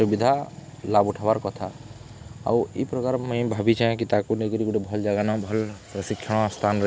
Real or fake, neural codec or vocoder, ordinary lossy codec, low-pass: real; none; none; none